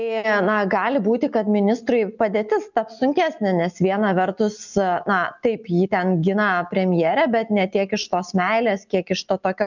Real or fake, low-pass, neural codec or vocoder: real; 7.2 kHz; none